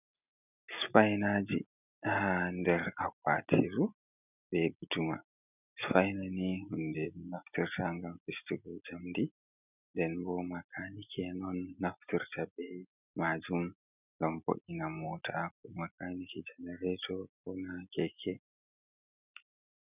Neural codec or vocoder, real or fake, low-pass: none; real; 3.6 kHz